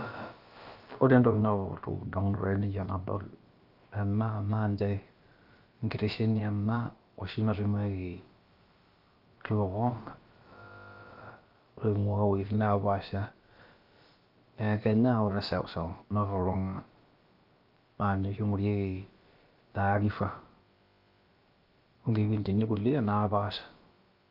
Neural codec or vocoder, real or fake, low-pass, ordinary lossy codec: codec, 16 kHz, about 1 kbps, DyCAST, with the encoder's durations; fake; 5.4 kHz; Opus, 32 kbps